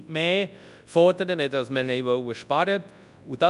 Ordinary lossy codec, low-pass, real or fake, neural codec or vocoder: none; 10.8 kHz; fake; codec, 24 kHz, 0.9 kbps, WavTokenizer, large speech release